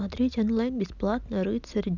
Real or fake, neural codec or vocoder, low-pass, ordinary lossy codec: real; none; 7.2 kHz; none